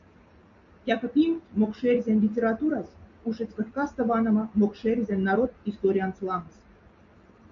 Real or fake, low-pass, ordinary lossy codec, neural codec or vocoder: real; 7.2 kHz; MP3, 96 kbps; none